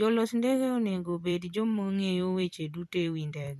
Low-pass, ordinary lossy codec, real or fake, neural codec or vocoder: 14.4 kHz; none; fake; vocoder, 44.1 kHz, 128 mel bands every 256 samples, BigVGAN v2